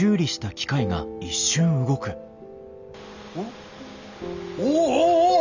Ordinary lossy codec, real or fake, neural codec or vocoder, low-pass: none; real; none; 7.2 kHz